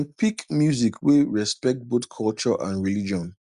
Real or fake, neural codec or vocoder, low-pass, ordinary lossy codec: real; none; 10.8 kHz; Opus, 64 kbps